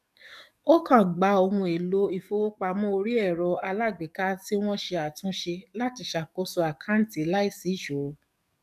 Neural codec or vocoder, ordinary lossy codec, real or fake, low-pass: codec, 44.1 kHz, 7.8 kbps, DAC; none; fake; 14.4 kHz